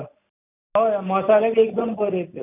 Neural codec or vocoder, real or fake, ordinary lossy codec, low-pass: none; real; none; 3.6 kHz